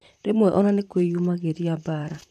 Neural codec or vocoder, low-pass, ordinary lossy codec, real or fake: vocoder, 44.1 kHz, 128 mel bands, Pupu-Vocoder; 14.4 kHz; none; fake